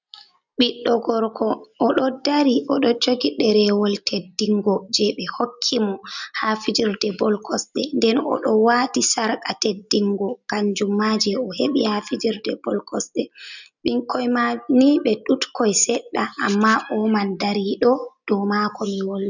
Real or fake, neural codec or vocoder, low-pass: real; none; 7.2 kHz